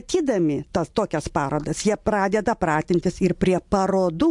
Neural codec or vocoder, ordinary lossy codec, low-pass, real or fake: none; MP3, 64 kbps; 10.8 kHz; real